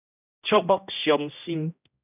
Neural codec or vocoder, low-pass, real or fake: codec, 16 kHz, 1 kbps, X-Codec, HuBERT features, trained on general audio; 3.6 kHz; fake